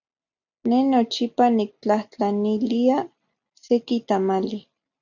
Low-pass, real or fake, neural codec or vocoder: 7.2 kHz; real; none